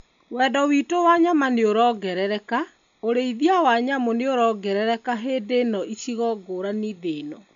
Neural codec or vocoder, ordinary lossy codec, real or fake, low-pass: none; none; real; 7.2 kHz